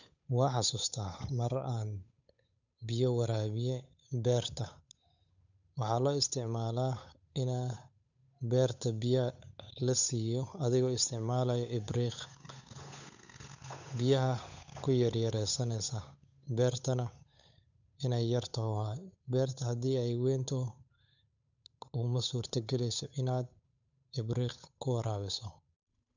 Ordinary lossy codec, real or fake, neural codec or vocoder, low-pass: none; fake; codec, 16 kHz, 8 kbps, FunCodec, trained on Chinese and English, 25 frames a second; 7.2 kHz